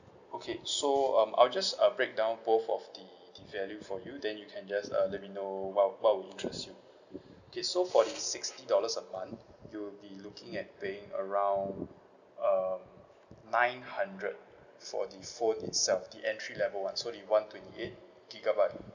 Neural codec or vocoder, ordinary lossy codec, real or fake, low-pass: none; none; real; 7.2 kHz